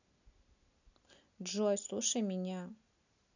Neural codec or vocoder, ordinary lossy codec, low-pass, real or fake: none; none; 7.2 kHz; real